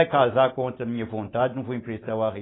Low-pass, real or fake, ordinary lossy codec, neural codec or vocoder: 7.2 kHz; real; AAC, 16 kbps; none